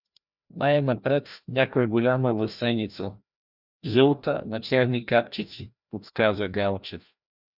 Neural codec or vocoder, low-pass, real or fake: codec, 16 kHz, 1 kbps, FreqCodec, larger model; 5.4 kHz; fake